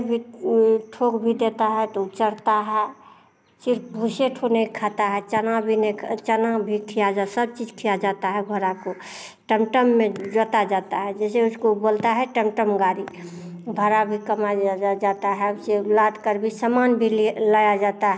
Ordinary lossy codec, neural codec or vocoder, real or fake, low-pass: none; none; real; none